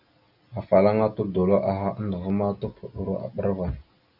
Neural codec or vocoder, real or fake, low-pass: none; real; 5.4 kHz